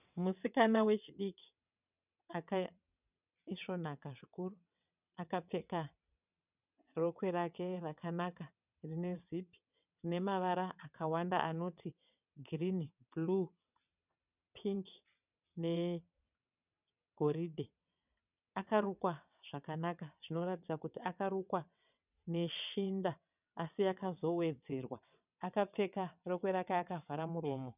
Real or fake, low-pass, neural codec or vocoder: fake; 3.6 kHz; vocoder, 22.05 kHz, 80 mel bands, WaveNeXt